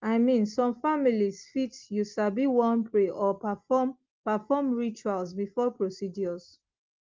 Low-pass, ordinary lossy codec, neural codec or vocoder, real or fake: 7.2 kHz; Opus, 32 kbps; none; real